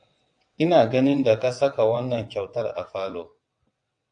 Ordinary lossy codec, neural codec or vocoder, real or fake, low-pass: AAC, 64 kbps; vocoder, 22.05 kHz, 80 mel bands, WaveNeXt; fake; 9.9 kHz